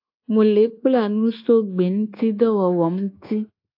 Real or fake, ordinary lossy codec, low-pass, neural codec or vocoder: fake; AAC, 32 kbps; 5.4 kHz; codec, 24 kHz, 1.2 kbps, DualCodec